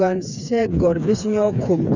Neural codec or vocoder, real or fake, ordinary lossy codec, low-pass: codec, 16 kHz, 4 kbps, FreqCodec, smaller model; fake; none; 7.2 kHz